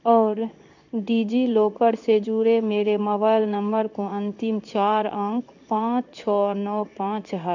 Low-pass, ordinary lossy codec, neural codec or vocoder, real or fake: 7.2 kHz; none; codec, 16 kHz in and 24 kHz out, 1 kbps, XY-Tokenizer; fake